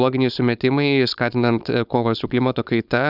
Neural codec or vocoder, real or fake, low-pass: codec, 16 kHz, 4.8 kbps, FACodec; fake; 5.4 kHz